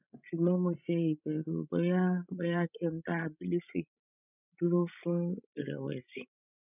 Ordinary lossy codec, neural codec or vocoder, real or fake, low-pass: none; codec, 16 kHz, 16 kbps, FreqCodec, larger model; fake; 3.6 kHz